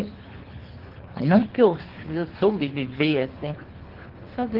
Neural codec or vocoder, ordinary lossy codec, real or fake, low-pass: codec, 24 kHz, 3 kbps, HILCodec; Opus, 16 kbps; fake; 5.4 kHz